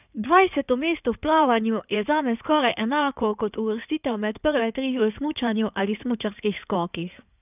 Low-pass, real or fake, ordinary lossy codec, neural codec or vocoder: 3.6 kHz; fake; none; codec, 16 kHz in and 24 kHz out, 2.2 kbps, FireRedTTS-2 codec